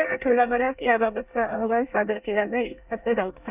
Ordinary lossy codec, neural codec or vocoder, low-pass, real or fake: none; codec, 24 kHz, 1 kbps, SNAC; 3.6 kHz; fake